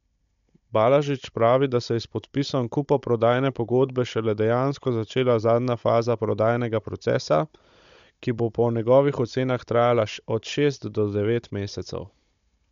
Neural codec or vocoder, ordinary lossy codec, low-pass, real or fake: codec, 16 kHz, 16 kbps, FunCodec, trained on Chinese and English, 50 frames a second; MP3, 64 kbps; 7.2 kHz; fake